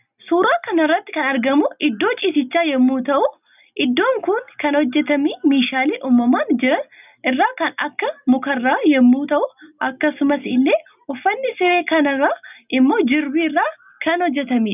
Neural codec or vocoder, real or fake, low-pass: none; real; 3.6 kHz